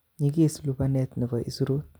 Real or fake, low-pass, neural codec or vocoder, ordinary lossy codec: real; none; none; none